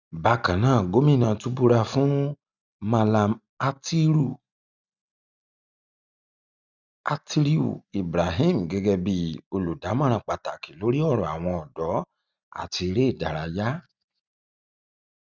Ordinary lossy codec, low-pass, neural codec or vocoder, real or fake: none; 7.2 kHz; none; real